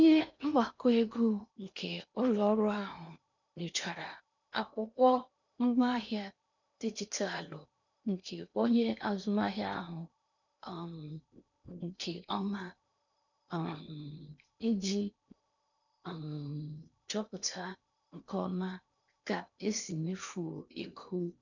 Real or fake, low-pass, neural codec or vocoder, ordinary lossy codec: fake; 7.2 kHz; codec, 16 kHz in and 24 kHz out, 0.8 kbps, FocalCodec, streaming, 65536 codes; none